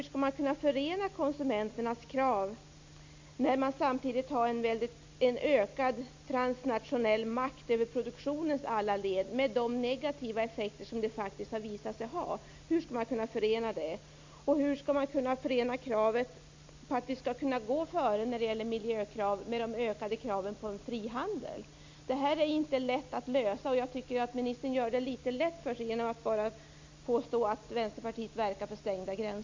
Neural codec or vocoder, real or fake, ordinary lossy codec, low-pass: none; real; MP3, 64 kbps; 7.2 kHz